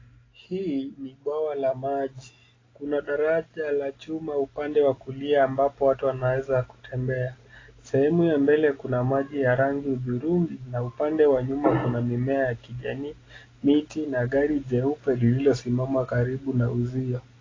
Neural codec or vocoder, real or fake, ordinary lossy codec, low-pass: none; real; AAC, 32 kbps; 7.2 kHz